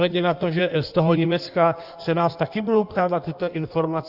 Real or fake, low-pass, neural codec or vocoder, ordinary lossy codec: fake; 5.4 kHz; codec, 16 kHz in and 24 kHz out, 1.1 kbps, FireRedTTS-2 codec; Opus, 64 kbps